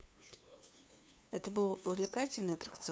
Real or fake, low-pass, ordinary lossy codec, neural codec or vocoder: fake; none; none; codec, 16 kHz, 2 kbps, FunCodec, trained on LibriTTS, 25 frames a second